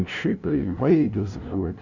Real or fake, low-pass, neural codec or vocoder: fake; 7.2 kHz; codec, 16 kHz, 0.5 kbps, FunCodec, trained on LibriTTS, 25 frames a second